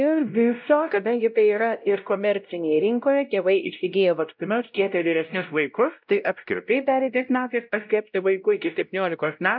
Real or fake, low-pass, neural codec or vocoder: fake; 5.4 kHz; codec, 16 kHz, 0.5 kbps, X-Codec, WavLM features, trained on Multilingual LibriSpeech